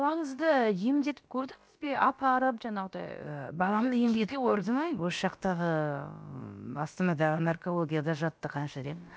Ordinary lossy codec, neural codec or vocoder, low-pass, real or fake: none; codec, 16 kHz, about 1 kbps, DyCAST, with the encoder's durations; none; fake